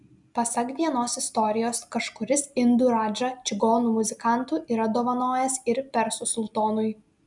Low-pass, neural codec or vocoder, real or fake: 10.8 kHz; none; real